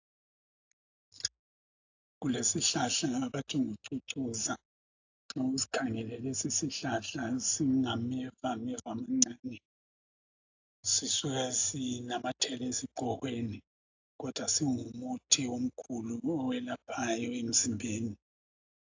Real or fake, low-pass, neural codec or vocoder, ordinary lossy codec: real; 7.2 kHz; none; AAC, 48 kbps